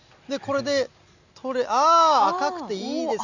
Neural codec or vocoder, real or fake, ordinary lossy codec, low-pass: none; real; none; 7.2 kHz